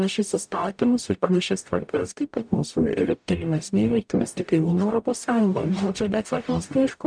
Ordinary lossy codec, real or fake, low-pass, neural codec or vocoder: MP3, 96 kbps; fake; 9.9 kHz; codec, 44.1 kHz, 0.9 kbps, DAC